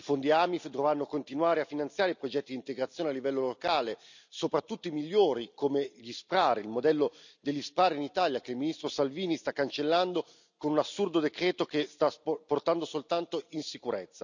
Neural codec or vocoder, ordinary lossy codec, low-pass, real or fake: none; none; 7.2 kHz; real